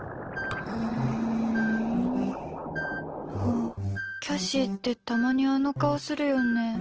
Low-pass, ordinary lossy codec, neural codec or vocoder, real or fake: 7.2 kHz; Opus, 16 kbps; none; real